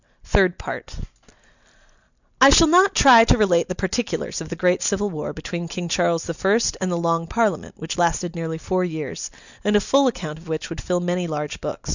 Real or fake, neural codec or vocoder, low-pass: real; none; 7.2 kHz